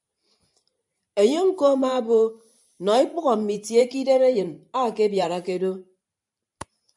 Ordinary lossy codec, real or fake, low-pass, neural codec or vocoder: MP3, 96 kbps; fake; 10.8 kHz; vocoder, 44.1 kHz, 128 mel bands every 512 samples, BigVGAN v2